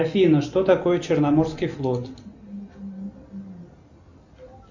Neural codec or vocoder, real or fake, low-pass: none; real; 7.2 kHz